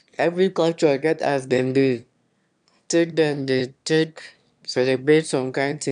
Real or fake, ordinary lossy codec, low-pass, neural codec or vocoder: fake; none; 9.9 kHz; autoencoder, 22.05 kHz, a latent of 192 numbers a frame, VITS, trained on one speaker